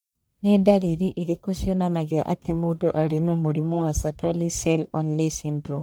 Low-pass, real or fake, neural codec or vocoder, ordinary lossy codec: none; fake; codec, 44.1 kHz, 1.7 kbps, Pupu-Codec; none